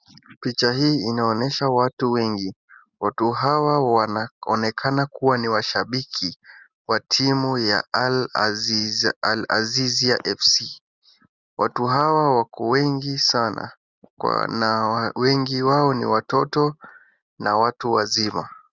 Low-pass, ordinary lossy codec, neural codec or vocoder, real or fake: 7.2 kHz; Opus, 64 kbps; none; real